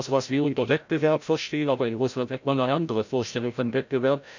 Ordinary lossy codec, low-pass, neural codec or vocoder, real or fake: none; 7.2 kHz; codec, 16 kHz, 0.5 kbps, FreqCodec, larger model; fake